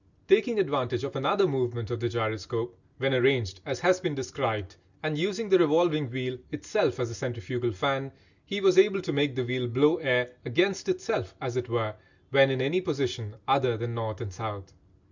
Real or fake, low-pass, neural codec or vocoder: real; 7.2 kHz; none